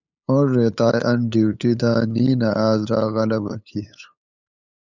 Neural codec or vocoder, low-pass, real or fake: codec, 16 kHz, 8 kbps, FunCodec, trained on LibriTTS, 25 frames a second; 7.2 kHz; fake